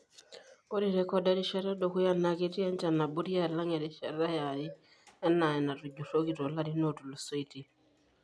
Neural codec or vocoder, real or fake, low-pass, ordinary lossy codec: none; real; none; none